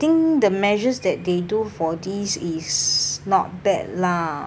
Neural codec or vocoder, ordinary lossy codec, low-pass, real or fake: none; none; none; real